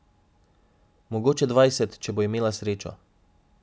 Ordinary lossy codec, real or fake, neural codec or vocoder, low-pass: none; real; none; none